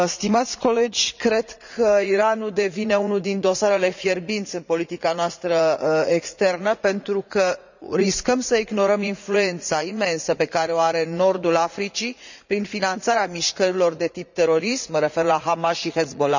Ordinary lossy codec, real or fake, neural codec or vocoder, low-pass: none; fake; vocoder, 44.1 kHz, 80 mel bands, Vocos; 7.2 kHz